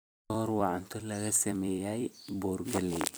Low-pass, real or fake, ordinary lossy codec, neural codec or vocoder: none; fake; none; vocoder, 44.1 kHz, 128 mel bands every 256 samples, BigVGAN v2